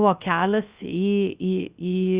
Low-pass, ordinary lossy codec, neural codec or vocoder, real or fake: 3.6 kHz; Opus, 64 kbps; codec, 16 kHz, 0.3 kbps, FocalCodec; fake